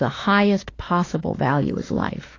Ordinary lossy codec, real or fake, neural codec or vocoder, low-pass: AAC, 32 kbps; fake; autoencoder, 48 kHz, 32 numbers a frame, DAC-VAE, trained on Japanese speech; 7.2 kHz